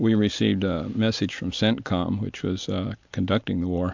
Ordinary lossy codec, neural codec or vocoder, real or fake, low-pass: MP3, 64 kbps; none; real; 7.2 kHz